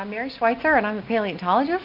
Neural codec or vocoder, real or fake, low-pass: none; real; 5.4 kHz